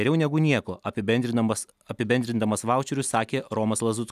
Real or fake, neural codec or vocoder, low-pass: real; none; 14.4 kHz